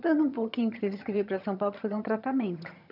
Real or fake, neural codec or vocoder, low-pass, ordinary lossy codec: fake; vocoder, 22.05 kHz, 80 mel bands, HiFi-GAN; 5.4 kHz; none